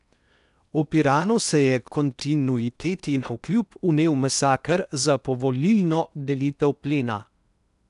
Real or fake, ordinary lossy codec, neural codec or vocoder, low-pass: fake; none; codec, 16 kHz in and 24 kHz out, 0.8 kbps, FocalCodec, streaming, 65536 codes; 10.8 kHz